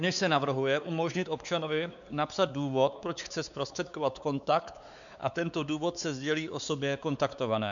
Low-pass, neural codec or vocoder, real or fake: 7.2 kHz; codec, 16 kHz, 4 kbps, X-Codec, WavLM features, trained on Multilingual LibriSpeech; fake